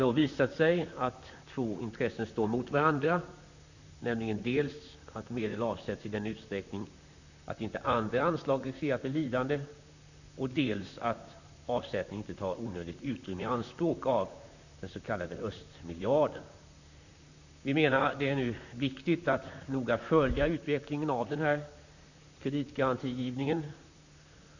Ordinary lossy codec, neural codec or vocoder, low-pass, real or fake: none; vocoder, 44.1 kHz, 128 mel bands, Pupu-Vocoder; 7.2 kHz; fake